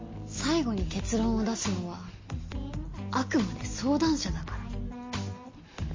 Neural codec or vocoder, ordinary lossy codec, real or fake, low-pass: none; MP3, 32 kbps; real; 7.2 kHz